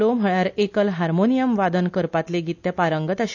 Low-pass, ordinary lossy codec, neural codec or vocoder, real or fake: 7.2 kHz; none; none; real